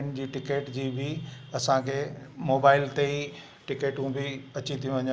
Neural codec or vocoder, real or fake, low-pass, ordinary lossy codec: none; real; none; none